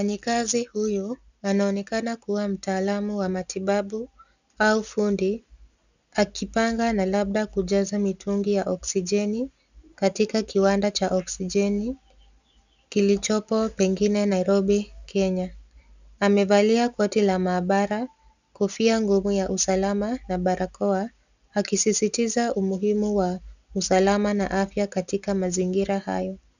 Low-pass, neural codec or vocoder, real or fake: 7.2 kHz; none; real